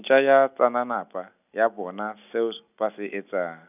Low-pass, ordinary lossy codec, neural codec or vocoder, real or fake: 3.6 kHz; none; none; real